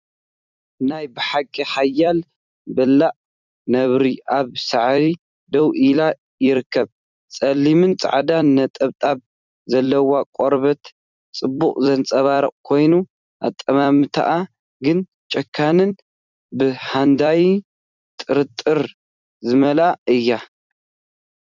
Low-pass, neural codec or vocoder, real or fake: 7.2 kHz; vocoder, 44.1 kHz, 128 mel bands every 256 samples, BigVGAN v2; fake